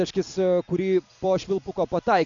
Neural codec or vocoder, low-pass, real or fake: none; 7.2 kHz; real